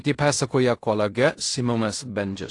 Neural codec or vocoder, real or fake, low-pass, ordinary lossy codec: codec, 16 kHz in and 24 kHz out, 0.4 kbps, LongCat-Audio-Codec, fine tuned four codebook decoder; fake; 10.8 kHz; AAC, 48 kbps